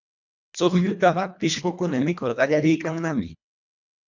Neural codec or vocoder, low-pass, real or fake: codec, 24 kHz, 1.5 kbps, HILCodec; 7.2 kHz; fake